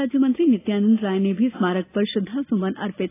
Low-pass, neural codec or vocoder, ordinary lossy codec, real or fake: 3.6 kHz; none; AAC, 16 kbps; real